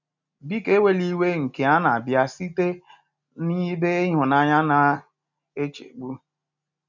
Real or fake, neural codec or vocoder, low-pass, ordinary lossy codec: real; none; 7.2 kHz; none